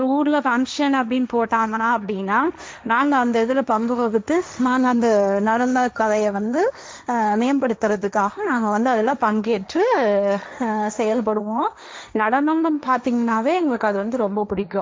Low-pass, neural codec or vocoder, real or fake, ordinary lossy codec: none; codec, 16 kHz, 1.1 kbps, Voila-Tokenizer; fake; none